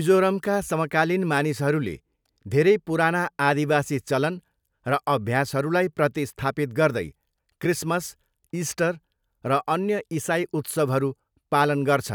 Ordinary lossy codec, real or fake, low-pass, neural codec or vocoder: none; real; none; none